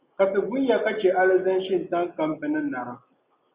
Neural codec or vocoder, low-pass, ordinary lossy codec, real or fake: none; 3.6 kHz; Opus, 64 kbps; real